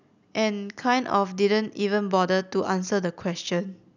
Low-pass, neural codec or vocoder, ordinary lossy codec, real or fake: 7.2 kHz; none; none; real